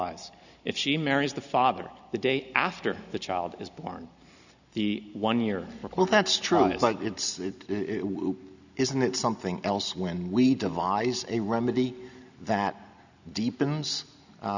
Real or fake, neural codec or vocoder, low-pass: real; none; 7.2 kHz